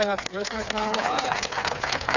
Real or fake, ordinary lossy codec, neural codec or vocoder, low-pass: fake; none; codec, 16 kHz, 4 kbps, FreqCodec, smaller model; 7.2 kHz